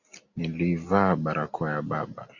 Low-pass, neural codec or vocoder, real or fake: 7.2 kHz; none; real